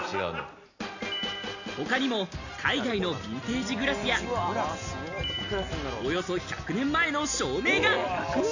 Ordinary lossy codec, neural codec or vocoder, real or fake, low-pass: none; none; real; 7.2 kHz